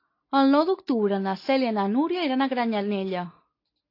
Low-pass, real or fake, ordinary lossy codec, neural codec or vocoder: 5.4 kHz; real; AAC, 32 kbps; none